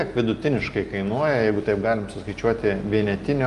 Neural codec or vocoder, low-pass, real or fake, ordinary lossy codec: none; 10.8 kHz; real; Opus, 32 kbps